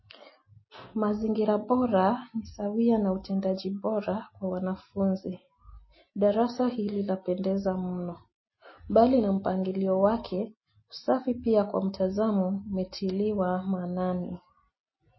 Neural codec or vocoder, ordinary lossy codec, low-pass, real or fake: none; MP3, 24 kbps; 7.2 kHz; real